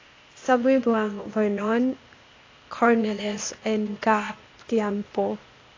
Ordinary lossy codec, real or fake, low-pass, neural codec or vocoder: AAC, 32 kbps; fake; 7.2 kHz; codec, 16 kHz, 0.8 kbps, ZipCodec